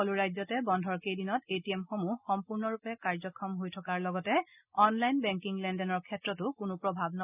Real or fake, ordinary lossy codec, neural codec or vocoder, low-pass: real; none; none; 3.6 kHz